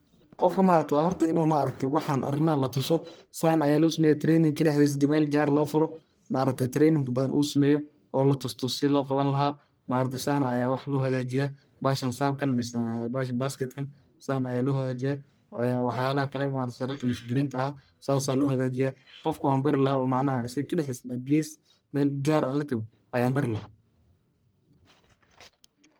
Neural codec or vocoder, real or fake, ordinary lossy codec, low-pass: codec, 44.1 kHz, 1.7 kbps, Pupu-Codec; fake; none; none